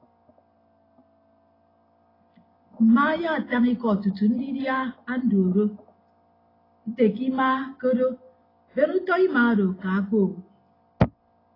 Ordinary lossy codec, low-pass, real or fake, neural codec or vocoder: AAC, 24 kbps; 5.4 kHz; real; none